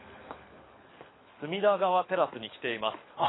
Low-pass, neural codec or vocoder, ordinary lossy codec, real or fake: 7.2 kHz; codec, 24 kHz, 6 kbps, HILCodec; AAC, 16 kbps; fake